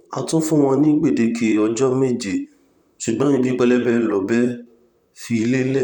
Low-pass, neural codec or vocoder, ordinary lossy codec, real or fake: 19.8 kHz; vocoder, 44.1 kHz, 128 mel bands, Pupu-Vocoder; none; fake